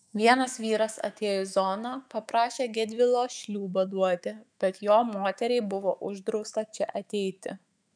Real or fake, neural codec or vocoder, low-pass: fake; autoencoder, 48 kHz, 128 numbers a frame, DAC-VAE, trained on Japanese speech; 9.9 kHz